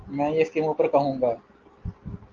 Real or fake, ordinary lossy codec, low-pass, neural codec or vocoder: real; Opus, 32 kbps; 7.2 kHz; none